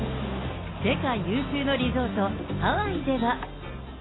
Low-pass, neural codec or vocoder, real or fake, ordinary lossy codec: 7.2 kHz; none; real; AAC, 16 kbps